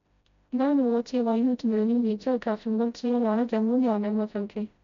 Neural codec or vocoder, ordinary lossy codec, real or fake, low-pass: codec, 16 kHz, 0.5 kbps, FreqCodec, smaller model; MP3, 64 kbps; fake; 7.2 kHz